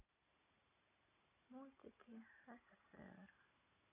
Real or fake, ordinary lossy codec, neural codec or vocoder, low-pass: real; none; none; 3.6 kHz